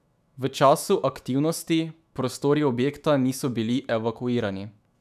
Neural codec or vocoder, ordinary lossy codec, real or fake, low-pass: autoencoder, 48 kHz, 128 numbers a frame, DAC-VAE, trained on Japanese speech; none; fake; 14.4 kHz